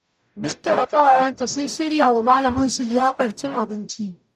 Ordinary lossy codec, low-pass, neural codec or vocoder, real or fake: none; 14.4 kHz; codec, 44.1 kHz, 0.9 kbps, DAC; fake